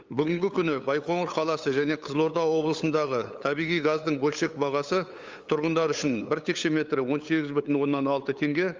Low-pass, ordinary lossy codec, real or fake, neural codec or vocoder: 7.2 kHz; Opus, 32 kbps; fake; codec, 16 kHz, 8 kbps, FunCodec, trained on LibriTTS, 25 frames a second